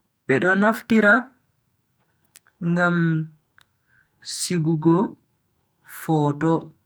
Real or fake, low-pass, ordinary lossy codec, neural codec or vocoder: fake; none; none; codec, 44.1 kHz, 2.6 kbps, SNAC